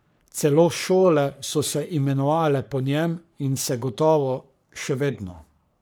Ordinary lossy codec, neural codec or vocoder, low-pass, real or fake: none; codec, 44.1 kHz, 3.4 kbps, Pupu-Codec; none; fake